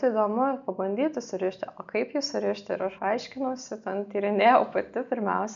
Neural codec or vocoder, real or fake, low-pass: none; real; 7.2 kHz